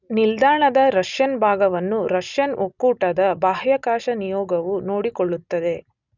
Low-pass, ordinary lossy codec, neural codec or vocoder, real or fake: 7.2 kHz; none; none; real